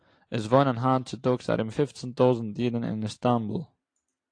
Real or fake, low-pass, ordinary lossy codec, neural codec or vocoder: fake; 9.9 kHz; AAC, 48 kbps; vocoder, 44.1 kHz, 128 mel bands every 256 samples, BigVGAN v2